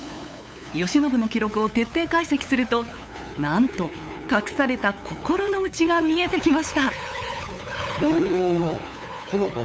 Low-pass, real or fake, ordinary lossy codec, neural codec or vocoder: none; fake; none; codec, 16 kHz, 8 kbps, FunCodec, trained on LibriTTS, 25 frames a second